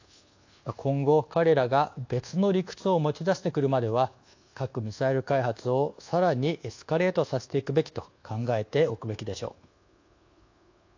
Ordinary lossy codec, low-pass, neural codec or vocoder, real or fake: AAC, 48 kbps; 7.2 kHz; codec, 24 kHz, 1.2 kbps, DualCodec; fake